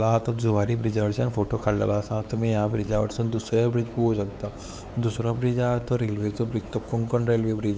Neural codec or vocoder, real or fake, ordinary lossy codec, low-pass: codec, 16 kHz, 4 kbps, X-Codec, WavLM features, trained on Multilingual LibriSpeech; fake; none; none